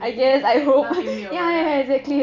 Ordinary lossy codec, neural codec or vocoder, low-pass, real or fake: none; none; 7.2 kHz; real